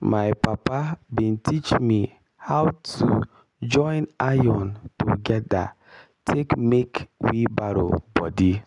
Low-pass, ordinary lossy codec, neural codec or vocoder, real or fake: 10.8 kHz; none; none; real